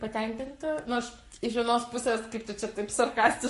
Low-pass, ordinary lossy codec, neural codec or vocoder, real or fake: 14.4 kHz; MP3, 48 kbps; codec, 44.1 kHz, 7.8 kbps, Pupu-Codec; fake